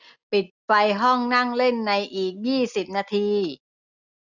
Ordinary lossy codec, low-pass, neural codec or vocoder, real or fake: none; 7.2 kHz; none; real